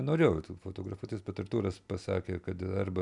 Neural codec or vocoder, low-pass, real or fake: none; 10.8 kHz; real